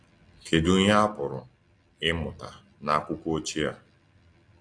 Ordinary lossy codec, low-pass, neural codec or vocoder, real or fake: AAC, 48 kbps; 9.9 kHz; none; real